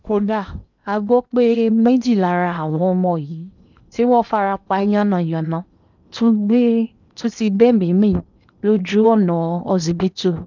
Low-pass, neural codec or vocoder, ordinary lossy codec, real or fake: 7.2 kHz; codec, 16 kHz in and 24 kHz out, 0.8 kbps, FocalCodec, streaming, 65536 codes; none; fake